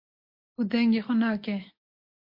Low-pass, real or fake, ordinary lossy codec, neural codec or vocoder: 5.4 kHz; real; MP3, 32 kbps; none